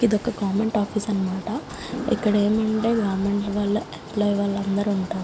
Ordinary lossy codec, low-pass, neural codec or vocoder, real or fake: none; none; none; real